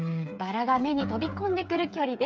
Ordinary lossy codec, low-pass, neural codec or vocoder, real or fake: none; none; codec, 16 kHz, 8 kbps, FreqCodec, smaller model; fake